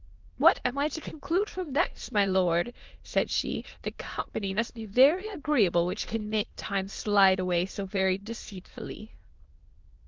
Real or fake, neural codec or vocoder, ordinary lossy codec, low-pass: fake; autoencoder, 22.05 kHz, a latent of 192 numbers a frame, VITS, trained on many speakers; Opus, 32 kbps; 7.2 kHz